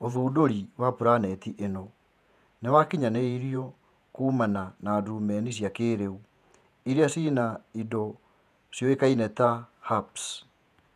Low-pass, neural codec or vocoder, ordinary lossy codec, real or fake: 14.4 kHz; vocoder, 48 kHz, 128 mel bands, Vocos; none; fake